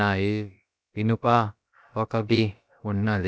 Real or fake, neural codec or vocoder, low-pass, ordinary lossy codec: fake; codec, 16 kHz, about 1 kbps, DyCAST, with the encoder's durations; none; none